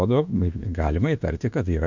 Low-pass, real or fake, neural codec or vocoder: 7.2 kHz; fake; autoencoder, 48 kHz, 32 numbers a frame, DAC-VAE, trained on Japanese speech